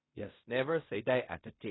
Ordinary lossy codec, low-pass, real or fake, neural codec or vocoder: AAC, 16 kbps; 7.2 kHz; fake; codec, 16 kHz in and 24 kHz out, 0.4 kbps, LongCat-Audio-Codec, fine tuned four codebook decoder